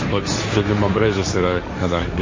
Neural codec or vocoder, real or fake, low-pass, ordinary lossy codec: codec, 16 kHz, 1.1 kbps, Voila-Tokenizer; fake; 7.2 kHz; MP3, 32 kbps